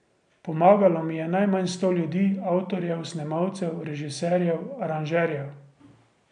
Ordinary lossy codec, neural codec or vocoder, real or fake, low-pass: none; none; real; 9.9 kHz